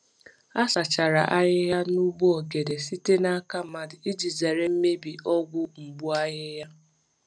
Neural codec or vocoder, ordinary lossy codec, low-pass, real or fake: none; none; 9.9 kHz; real